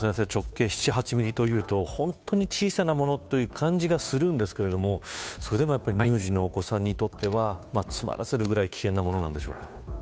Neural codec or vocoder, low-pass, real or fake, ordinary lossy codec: codec, 16 kHz, 2 kbps, FunCodec, trained on Chinese and English, 25 frames a second; none; fake; none